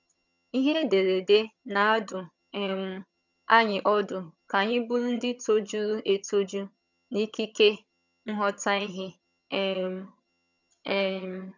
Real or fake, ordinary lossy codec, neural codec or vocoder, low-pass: fake; none; vocoder, 22.05 kHz, 80 mel bands, HiFi-GAN; 7.2 kHz